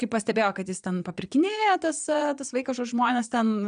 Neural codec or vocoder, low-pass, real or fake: vocoder, 22.05 kHz, 80 mel bands, Vocos; 9.9 kHz; fake